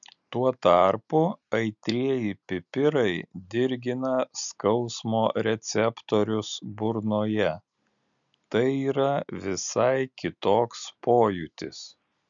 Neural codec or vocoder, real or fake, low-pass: none; real; 7.2 kHz